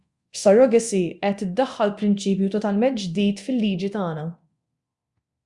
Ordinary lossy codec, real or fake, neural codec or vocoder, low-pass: Opus, 64 kbps; fake; codec, 24 kHz, 0.9 kbps, DualCodec; 10.8 kHz